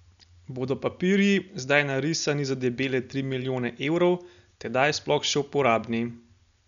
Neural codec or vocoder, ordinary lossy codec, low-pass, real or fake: none; none; 7.2 kHz; real